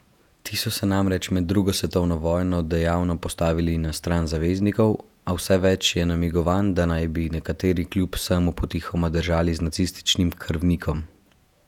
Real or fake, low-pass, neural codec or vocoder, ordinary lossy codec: fake; 19.8 kHz; vocoder, 48 kHz, 128 mel bands, Vocos; none